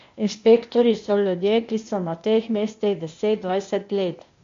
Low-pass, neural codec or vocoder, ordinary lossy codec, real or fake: 7.2 kHz; codec, 16 kHz, 0.8 kbps, ZipCodec; MP3, 48 kbps; fake